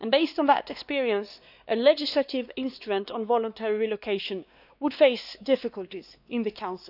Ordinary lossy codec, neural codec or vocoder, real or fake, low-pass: none; codec, 16 kHz, 2 kbps, X-Codec, WavLM features, trained on Multilingual LibriSpeech; fake; 5.4 kHz